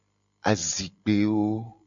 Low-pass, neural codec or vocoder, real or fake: 7.2 kHz; none; real